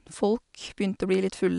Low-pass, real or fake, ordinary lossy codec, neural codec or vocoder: 10.8 kHz; real; none; none